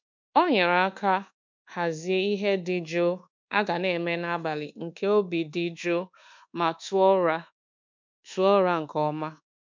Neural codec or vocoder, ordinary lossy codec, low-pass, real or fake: codec, 24 kHz, 1.2 kbps, DualCodec; MP3, 64 kbps; 7.2 kHz; fake